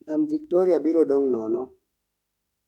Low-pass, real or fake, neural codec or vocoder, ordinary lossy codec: 19.8 kHz; fake; autoencoder, 48 kHz, 32 numbers a frame, DAC-VAE, trained on Japanese speech; none